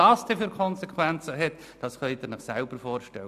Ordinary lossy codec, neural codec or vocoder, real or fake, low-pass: AAC, 96 kbps; none; real; 14.4 kHz